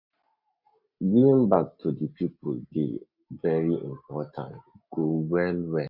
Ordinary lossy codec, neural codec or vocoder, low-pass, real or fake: none; none; 5.4 kHz; real